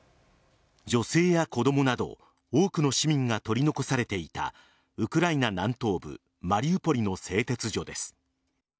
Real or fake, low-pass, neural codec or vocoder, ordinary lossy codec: real; none; none; none